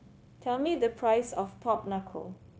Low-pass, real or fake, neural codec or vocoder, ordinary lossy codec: none; fake; codec, 16 kHz, 0.9 kbps, LongCat-Audio-Codec; none